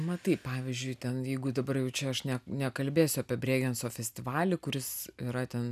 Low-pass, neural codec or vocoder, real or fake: 14.4 kHz; none; real